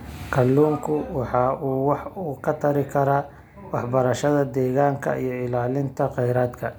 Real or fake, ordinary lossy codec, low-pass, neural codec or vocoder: real; none; none; none